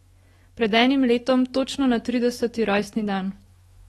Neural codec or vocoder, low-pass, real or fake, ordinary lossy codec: autoencoder, 48 kHz, 128 numbers a frame, DAC-VAE, trained on Japanese speech; 19.8 kHz; fake; AAC, 32 kbps